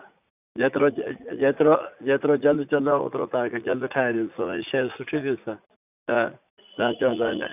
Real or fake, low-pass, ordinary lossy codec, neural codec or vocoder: fake; 3.6 kHz; none; vocoder, 44.1 kHz, 80 mel bands, Vocos